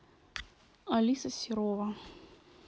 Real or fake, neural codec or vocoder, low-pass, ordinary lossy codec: real; none; none; none